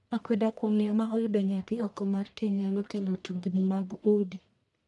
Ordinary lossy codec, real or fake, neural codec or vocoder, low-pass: none; fake; codec, 44.1 kHz, 1.7 kbps, Pupu-Codec; 10.8 kHz